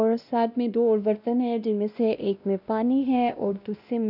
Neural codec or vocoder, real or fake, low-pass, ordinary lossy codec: codec, 16 kHz, 1 kbps, X-Codec, WavLM features, trained on Multilingual LibriSpeech; fake; 5.4 kHz; none